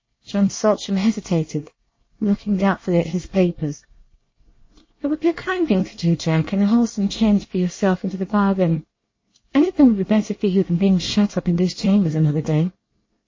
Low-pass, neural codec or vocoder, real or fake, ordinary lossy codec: 7.2 kHz; codec, 24 kHz, 1 kbps, SNAC; fake; MP3, 32 kbps